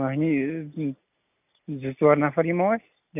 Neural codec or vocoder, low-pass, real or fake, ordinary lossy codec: none; 3.6 kHz; real; none